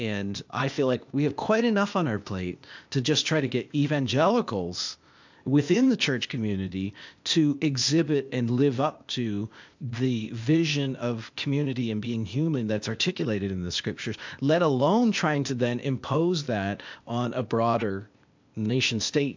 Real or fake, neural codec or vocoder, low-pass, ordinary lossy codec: fake; codec, 16 kHz, 0.8 kbps, ZipCodec; 7.2 kHz; MP3, 64 kbps